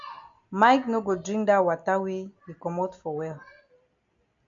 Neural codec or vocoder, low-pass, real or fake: none; 7.2 kHz; real